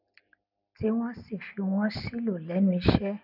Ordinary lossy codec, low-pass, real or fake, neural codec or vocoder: none; 5.4 kHz; real; none